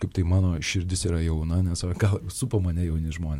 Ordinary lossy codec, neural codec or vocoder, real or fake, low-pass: MP3, 64 kbps; none; real; 19.8 kHz